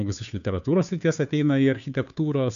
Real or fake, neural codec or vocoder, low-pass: fake; codec, 16 kHz, 2 kbps, FunCodec, trained on Chinese and English, 25 frames a second; 7.2 kHz